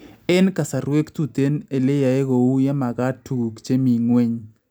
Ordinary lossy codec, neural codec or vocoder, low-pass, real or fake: none; none; none; real